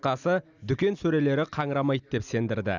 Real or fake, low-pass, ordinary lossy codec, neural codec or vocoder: real; 7.2 kHz; none; none